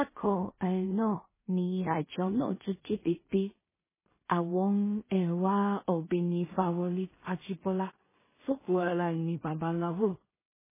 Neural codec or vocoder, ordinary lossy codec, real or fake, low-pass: codec, 16 kHz in and 24 kHz out, 0.4 kbps, LongCat-Audio-Codec, two codebook decoder; MP3, 16 kbps; fake; 3.6 kHz